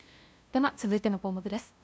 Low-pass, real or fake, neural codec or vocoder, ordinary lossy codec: none; fake; codec, 16 kHz, 0.5 kbps, FunCodec, trained on LibriTTS, 25 frames a second; none